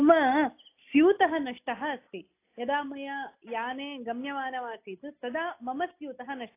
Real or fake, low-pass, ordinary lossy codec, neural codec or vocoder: real; 3.6 kHz; AAC, 24 kbps; none